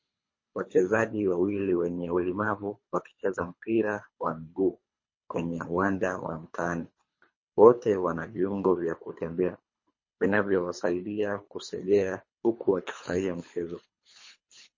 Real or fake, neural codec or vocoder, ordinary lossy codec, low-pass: fake; codec, 24 kHz, 3 kbps, HILCodec; MP3, 32 kbps; 7.2 kHz